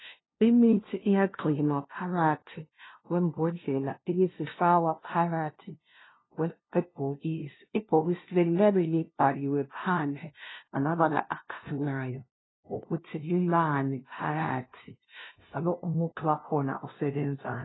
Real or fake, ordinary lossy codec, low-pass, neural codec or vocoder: fake; AAC, 16 kbps; 7.2 kHz; codec, 16 kHz, 0.5 kbps, FunCodec, trained on LibriTTS, 25 frames a second